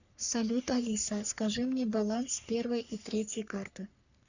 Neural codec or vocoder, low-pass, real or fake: codec, 44.1 kHz, 3.4 kbps, Pupu-Codec; 7.2 kHz; fake